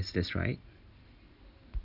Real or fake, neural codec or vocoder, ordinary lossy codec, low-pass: real; none; none; 5.4 kHz